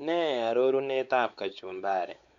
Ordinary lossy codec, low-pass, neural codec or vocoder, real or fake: Opus, 64 kbps; 7.2 kHz; codec, 16 kHz, 4 kbps, X-Codec, WavLM features, trained on Multilingual LibriSpeech; fake